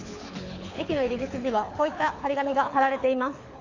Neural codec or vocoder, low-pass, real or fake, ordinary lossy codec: codec, 24 kHz, 6 kbps, HILCodec; 7.2 kHz; fake; AAC, 48 kbps